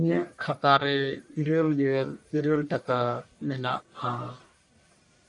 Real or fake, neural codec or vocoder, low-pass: fake; codec, 44.1 kHz, 1.7 kbps, Pupu-Codec; 10.8 kHz